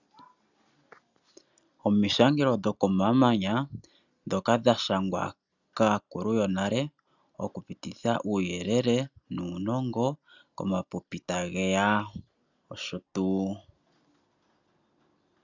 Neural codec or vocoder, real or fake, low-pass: none; real; 7.2 kHz